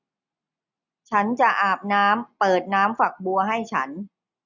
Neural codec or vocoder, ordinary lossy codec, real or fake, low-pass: none; none; real; 7.2 kHz